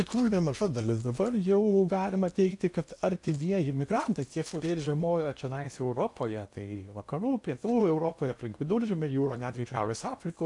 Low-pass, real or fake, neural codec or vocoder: 10.8 kHz; fake; codec, 16 kHz in and 24 kHz out, 0.8 kbps, FocalCodec, streaming, 65536 codes